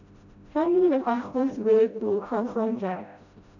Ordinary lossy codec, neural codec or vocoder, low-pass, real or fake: none; codec, 16 kHz, 0.5 kbps, FreqCodec, smaller model; 7.2 kHz; fake